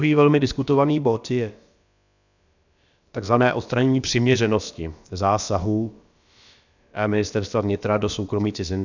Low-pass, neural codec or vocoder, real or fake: 7.2 kHz; codec, 16 kHz, about 1 kbps, DyCAST, with the encoder's durations; fake